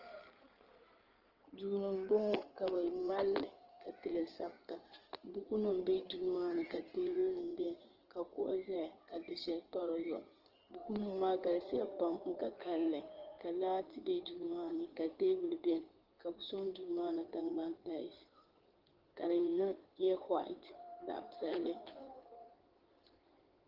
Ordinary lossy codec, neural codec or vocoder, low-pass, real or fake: Opus, 16 kbps; codec, 16 kHz in and 24 kHz out, 2.2 kbps, FireRedTTS-2 codec; 5.4 kHz; fake